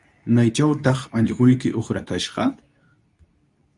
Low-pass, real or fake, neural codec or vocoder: 10.8 kHz; fake; codec, 24 kHz, 0.9 kbps, WavTokenizer, medium speech release version 2